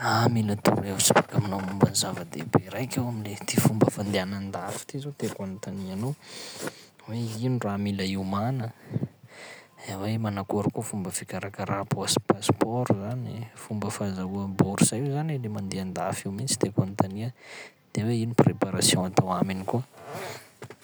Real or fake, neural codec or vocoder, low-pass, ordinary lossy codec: real; none; none; none